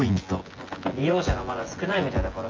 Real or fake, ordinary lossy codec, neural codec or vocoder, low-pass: fake; Opus, 24 kbps; vocoder, 24 kHz, 100 mel bands, Vocos; 7.2 kHz